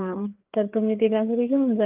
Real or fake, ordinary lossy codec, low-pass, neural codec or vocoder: fake; Opus, 32 kbps; 3.6 kHz; codec, 16 kHz, 4 kbps, FreqCodec, larger model